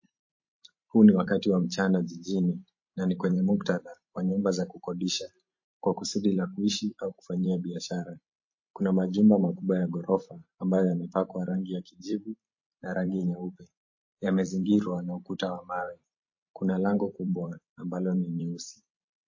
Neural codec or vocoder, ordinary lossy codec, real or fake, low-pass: vocoder, 44.1 kHz, 128 mel bands every 512 samples, BigVGAN v2; MP3, 32 kbps; fake; 7.2 kHz